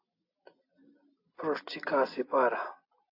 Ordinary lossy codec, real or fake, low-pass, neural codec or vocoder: AAC, 32 kbps; real; 5.4 kHz; none